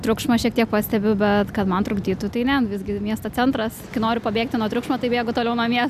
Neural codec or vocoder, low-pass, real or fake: none; 14.4 kHz; real